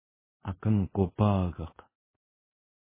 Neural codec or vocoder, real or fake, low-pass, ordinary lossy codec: codec, 24 kHz, 0.5 kbps, DualCodec; fake; 3.6 kHz; MP3, 16 kbps